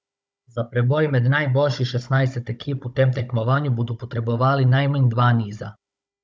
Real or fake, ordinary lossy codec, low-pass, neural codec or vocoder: fake; none; none; codec, 16 kHz, 16 kbps, FunCodec, trained on Chinese and English, 50 frames a second